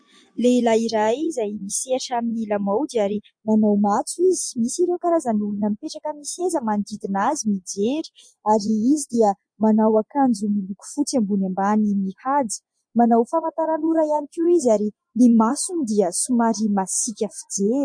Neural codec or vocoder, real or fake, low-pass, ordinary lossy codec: vocoder, 24 kHz, 100 mel bands, Vocos; fake; 9.9 kHz; MP3, 48 kbps